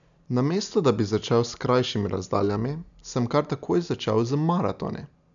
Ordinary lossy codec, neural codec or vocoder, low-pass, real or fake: MP3, 96 kbps; none; 7.2 kHz; real